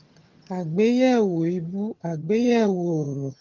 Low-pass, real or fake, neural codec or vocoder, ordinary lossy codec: 7.2 kHz; fake; vocoder, 22.05 kHz, 80 mel bands, HiFi-GAN; Opus, 24 kbps